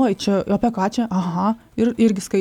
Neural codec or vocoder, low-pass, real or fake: none; 19.8 kHz; real